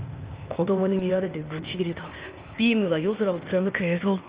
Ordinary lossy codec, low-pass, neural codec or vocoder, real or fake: Opus, 24 kbps; 3.6 kHz; codec, 16 kHz, 0.8 kbps, ZipCodec; fake